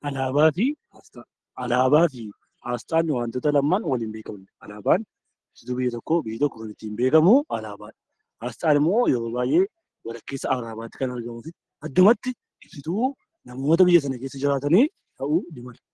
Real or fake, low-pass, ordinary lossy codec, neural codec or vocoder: real; 10.8 kHz; Opus, 16 kbps; none